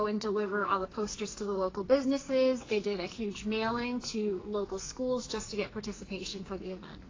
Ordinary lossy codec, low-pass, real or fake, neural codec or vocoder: AAC, 32 kbps; 7.2 kHz; fake; codec, 44.1 kHz, 2.6 kbps, SNAC